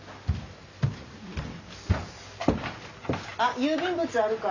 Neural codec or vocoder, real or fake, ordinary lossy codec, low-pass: none; real; none; 7.2 kHz